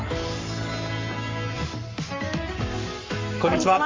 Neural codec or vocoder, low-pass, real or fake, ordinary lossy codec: codec, 44.1 kHz, 7.8 kbps, Pupu-Codec; 7.2 kHz; fake; Opus, 32 kbps